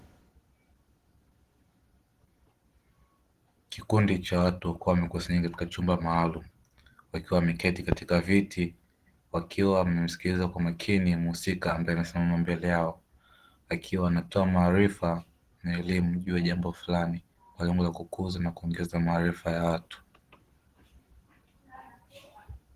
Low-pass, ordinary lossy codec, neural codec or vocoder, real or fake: 14.4 kHz; Opus, 16 kbps; none; real